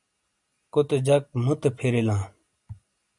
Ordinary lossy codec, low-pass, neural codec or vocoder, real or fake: MP3, 96 kbps; 10.8 kHz; none; real